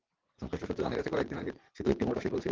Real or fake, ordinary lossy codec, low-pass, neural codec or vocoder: fake; Opus, 16 kbps; 7.2 kHz; vocoder, 44.1 kHz, 80 mel bands, Vocos